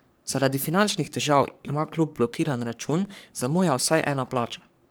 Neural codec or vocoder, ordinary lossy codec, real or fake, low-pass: codec, 44.1 kHz, 3.4 kbps, Pupu-Codec; none; fake; none